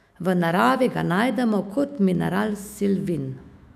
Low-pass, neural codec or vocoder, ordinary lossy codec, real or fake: 14.4 kHz; autoencoder, 48 kHz, 128 numbers a frame, DAC-VAE, trained on Japanese speech; none; fake